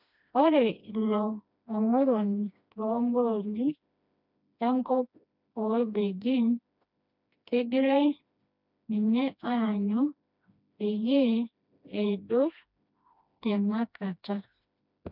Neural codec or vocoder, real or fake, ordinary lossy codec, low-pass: codec, 16 kHz, 1 kbps, FreqCodec, smaller model; fake; none; 5.4 kHz